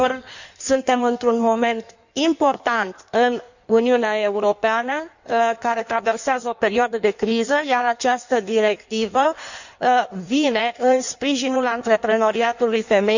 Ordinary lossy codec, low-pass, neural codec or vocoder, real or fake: none; 7.2 kHz; codec, 16 kHz in and 24 kHz out, 1.1 kbps, FireRedTTS-2 codec; fake